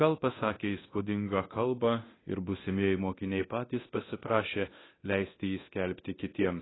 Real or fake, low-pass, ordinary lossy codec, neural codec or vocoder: fake; 7.2 kHz; AAC, 16 kbps; codec, 24 kHz, 0.9 kbps, DualCodec